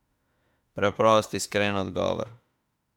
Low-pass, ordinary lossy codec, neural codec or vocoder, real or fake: 19.8 kHz; MP3, 96 kbps; autoencoder, 48 kHz, 32 numbers a frame, DAC-VAE, trained on Japanese speech; fake